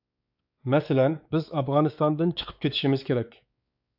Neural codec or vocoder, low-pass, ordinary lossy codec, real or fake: codec, 16 kHz, 4 kbps, X-Codec, WavLM features, trained on Multilingual LibriSpeech; 5.4 kHz; AAC, 48 kbps; fake